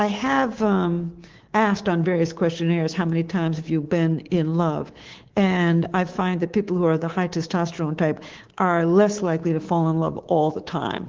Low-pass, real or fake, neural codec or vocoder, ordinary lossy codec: 7.2 kHz; fake; codec, 24 kHz, 3.1 kbps, DualCodec; Opus, 16 kbps